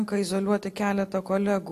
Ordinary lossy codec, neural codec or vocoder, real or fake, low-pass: AAC, 64 kbps; none; real; 14.4 kHz